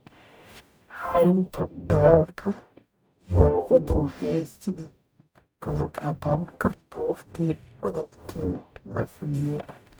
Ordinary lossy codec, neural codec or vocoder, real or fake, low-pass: none; codec, 44.1 kHz, 0.9 kbps, DAC; fake; none